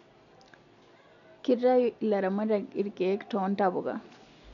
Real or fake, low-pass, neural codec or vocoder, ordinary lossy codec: real; 7.2 kHz; none; none